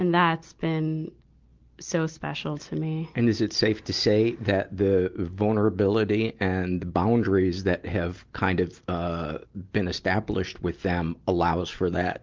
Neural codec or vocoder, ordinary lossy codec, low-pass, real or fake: none; Opus, 32 kbps; 7.2 kHz; real